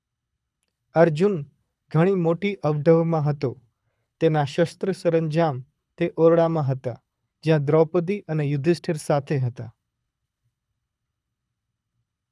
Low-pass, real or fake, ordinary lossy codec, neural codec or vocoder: none; fake; none; codec, 24 kHz, 6 kbps, HILCodec